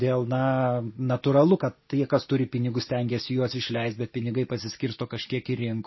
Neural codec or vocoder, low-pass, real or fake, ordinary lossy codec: none; 7.2 kHz; real; MP3, 24 kbps